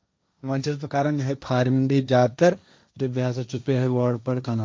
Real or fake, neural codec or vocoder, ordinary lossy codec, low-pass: fake; codec, 16 kHz, 1.1 kbps, Voila-Tokenizer; none; none